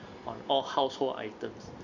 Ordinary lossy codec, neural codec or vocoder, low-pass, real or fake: none; none; 7.2 kHz; real